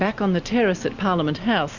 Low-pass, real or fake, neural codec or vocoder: 7.2 kHz; real; none